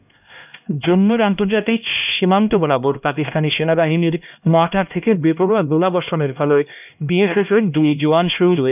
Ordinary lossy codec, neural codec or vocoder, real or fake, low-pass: none; codec, 16 kHz, 1 kbps, X-Codec, HuBERT features, trained on LibriSpeech; fake; 3.6 kHz